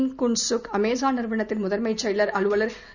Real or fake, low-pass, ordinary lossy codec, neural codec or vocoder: real; 7.2 kHz; none; none